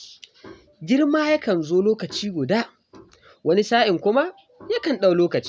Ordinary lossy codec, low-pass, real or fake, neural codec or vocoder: none; none; real; none